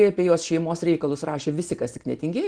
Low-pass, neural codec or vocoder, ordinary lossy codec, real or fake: 9.9 kHz; none; Opus, 16 kbps; real